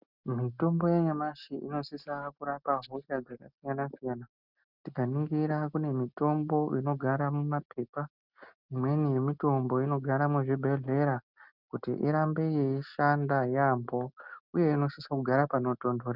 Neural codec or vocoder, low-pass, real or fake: none; 5.4 kHz; real